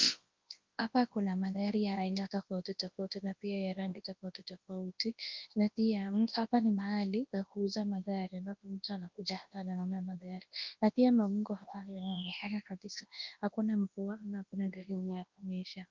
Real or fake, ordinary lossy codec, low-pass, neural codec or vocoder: fake; Opus, 32 kbps; 7.2 kHz; codec, 24 kHz, 0.9 kbps, WavTokenizer, large speech release